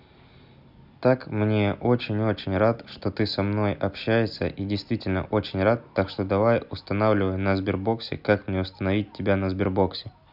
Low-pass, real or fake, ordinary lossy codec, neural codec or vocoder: 5.4 kHz; real; none; none